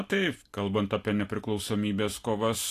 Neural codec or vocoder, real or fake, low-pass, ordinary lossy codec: none; real; 14.4 kHz; AAC, 64 kbps